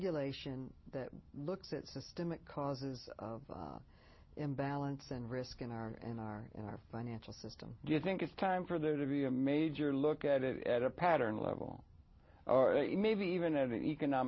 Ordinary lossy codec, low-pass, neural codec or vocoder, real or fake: MP3, 24 kbps; 7.2 kHz; none; real